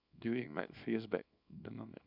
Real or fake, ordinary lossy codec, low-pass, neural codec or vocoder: fake; none; 5.4 kHz; codec, 24 kHz, 0.9 kbps, WavTokenizer, small release